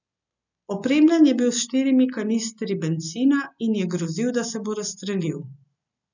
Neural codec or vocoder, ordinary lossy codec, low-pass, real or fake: none; none; 7.2 kHz; real